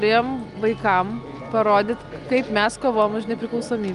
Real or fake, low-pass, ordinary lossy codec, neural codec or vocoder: real; 10.8 kHz; Opus, 32 kbps; none